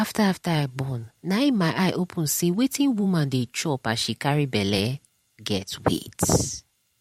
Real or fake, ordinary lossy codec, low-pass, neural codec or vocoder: fake; MP3, 64 kbps; 19.8 kHz; vocoder, 44.1 kHz, 128 mel bands every 512 samples, BigVGAN v2